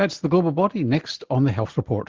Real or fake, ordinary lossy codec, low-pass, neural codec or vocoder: real; Opus, 16 kbps; 7.2 kHz; none